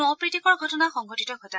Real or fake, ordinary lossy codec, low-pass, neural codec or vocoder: real; none; none; none